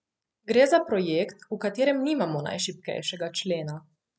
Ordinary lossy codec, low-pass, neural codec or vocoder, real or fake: none; none; none; real